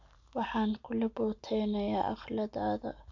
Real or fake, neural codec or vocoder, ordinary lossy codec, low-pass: real; none; none; 7.2 kHz